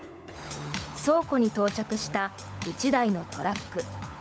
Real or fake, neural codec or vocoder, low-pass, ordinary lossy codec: fake; codec, 16 kHz, 16 kbps, FunCodec, trained on LibriTTS, 50 frames a second; none; none